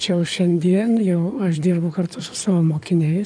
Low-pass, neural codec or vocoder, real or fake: 9.9 kHz; codec, 16 kHz in and 24 kHz out, 2.2 kbps, FireRedTTS-2 codec; fake